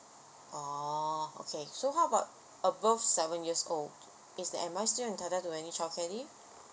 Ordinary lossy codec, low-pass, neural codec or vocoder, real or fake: none; none; none; real